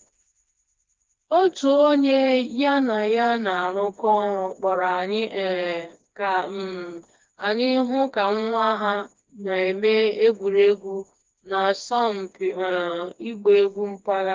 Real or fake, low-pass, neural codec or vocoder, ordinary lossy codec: fake; 7.2 kHz; codec, 16 kHz, 2 kbps, FreqCodec, smaller model; Opus, 24 kbps